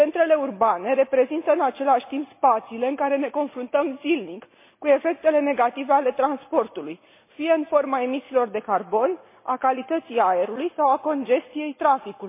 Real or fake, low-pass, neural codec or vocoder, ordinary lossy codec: real; 3.6 kHz; none; MP3, 24 kbps